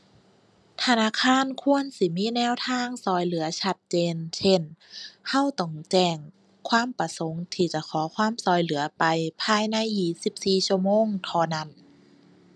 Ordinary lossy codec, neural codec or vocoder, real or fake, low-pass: none; none; real; none